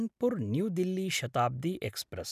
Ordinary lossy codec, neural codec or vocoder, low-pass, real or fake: none; none; 14.4 kHz; real